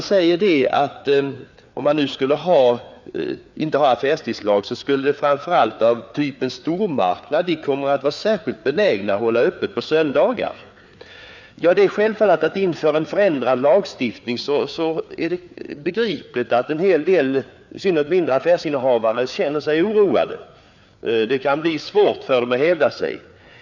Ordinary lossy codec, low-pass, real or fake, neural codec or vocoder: none; 7.2 kHz; fake; codec, 16 kHz, 4 kbps, FreqCodec, larger model